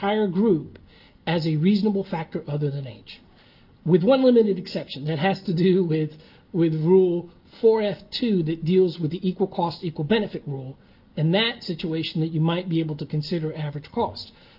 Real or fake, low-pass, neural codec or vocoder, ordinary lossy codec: real; 5.4 kHz; none; Opus, 32 kbps